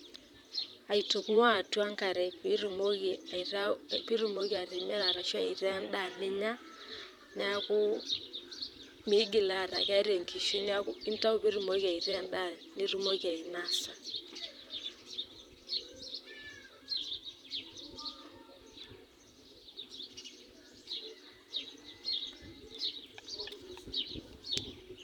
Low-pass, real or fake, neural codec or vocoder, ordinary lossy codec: 19.8 kHz; fake; vocoder, 44.1 kHz, 128 mel bands, Pupu-Vocoder; none